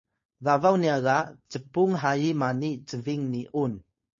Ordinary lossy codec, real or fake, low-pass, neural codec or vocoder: MP3, 32 kbps; fake; 7.2 kHz; codec, 16 kHz, 4.8 kbps, FACodec